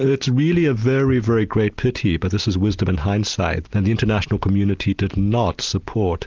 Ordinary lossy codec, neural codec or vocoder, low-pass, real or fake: Opus, 32 kbps; none; 7.2 kHz; real